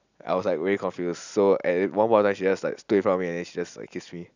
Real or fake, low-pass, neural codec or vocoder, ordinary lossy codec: real; 7.2 kHz; none; none